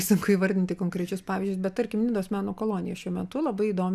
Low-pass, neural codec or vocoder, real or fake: 14.4 kHz; none; real